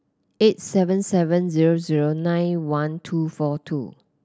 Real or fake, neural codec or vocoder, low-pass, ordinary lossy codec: real; none; none; none